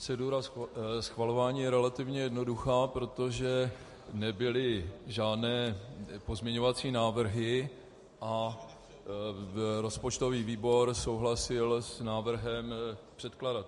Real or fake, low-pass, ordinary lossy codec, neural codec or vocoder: real; 14.4 kHz; MP3, 48 kbps; none